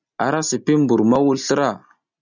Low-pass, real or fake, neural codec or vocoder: 7.2 kHz; real; none